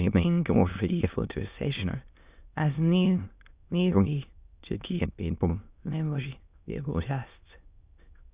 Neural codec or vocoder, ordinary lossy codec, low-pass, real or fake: autoencoder, 22.05 kHz, a latent of 192 numbers a frame, VITS, trained on many speakers; Opus, 64 kbps; 3.6 kHz; fake